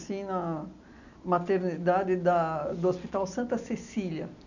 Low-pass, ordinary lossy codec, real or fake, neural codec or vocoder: 7.2 kHz; none; real; none